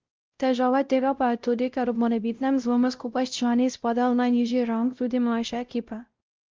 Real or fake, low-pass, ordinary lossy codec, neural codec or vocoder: fake; 7.2 kHz; Opus, 24 kbps; codec, 16 kHz, 0.5 kbps, X-Codec, WavLM features, trained on Multilingual LibriSpeech